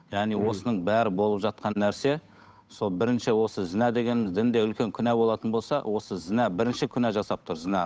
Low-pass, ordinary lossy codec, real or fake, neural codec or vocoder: none; none; fake; codec, 16 kHz, 8 kbps, FunCodec, trained on Chinese and English, 25 frames a second